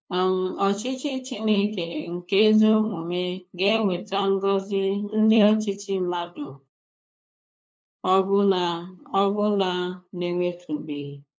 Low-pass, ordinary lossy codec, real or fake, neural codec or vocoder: none; none; fake; codec, 16 kHz, 2 kbps, FunCodec, trained on LibriTTS, 25 frames a second